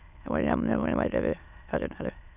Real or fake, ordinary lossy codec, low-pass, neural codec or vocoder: fake; none; 3.6 kHz; autoencoder, 22.05 kHz, a latent of 192 numbers a frame, VITS, trained on many speakers